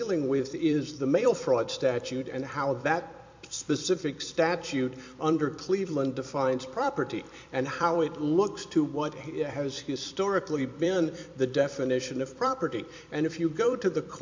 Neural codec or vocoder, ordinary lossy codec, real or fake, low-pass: vocoder, 44.1 kHz, 128 mel bands every 256 samples, BigVGAN v2; MP3, 48 kbps; fake; 7.2 kHz